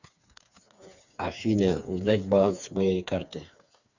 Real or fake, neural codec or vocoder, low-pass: fake; codec, 16 kHz, 4 kbps, FreqCodec, smaller model; 7.2 kHz